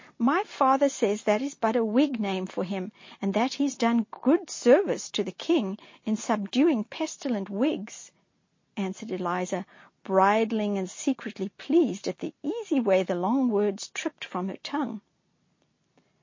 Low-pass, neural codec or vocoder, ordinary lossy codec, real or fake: 7.2 kHz; none; MP3, 32 kbps; real